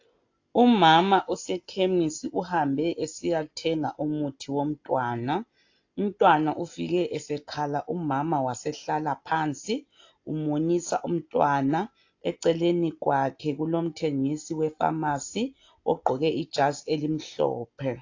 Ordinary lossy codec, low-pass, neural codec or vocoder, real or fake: AAC, 48 kbps; 7.2 kHz; none; real